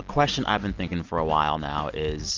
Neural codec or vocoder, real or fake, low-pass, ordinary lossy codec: none; real; 7.2 kHz; Opus, 24 kbps